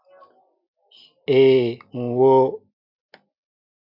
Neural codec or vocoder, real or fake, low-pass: none; real; 5.4 kHz